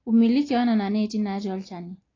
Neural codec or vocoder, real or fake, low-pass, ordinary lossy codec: none; real; 7.2 kHz; AAC, 32 kbps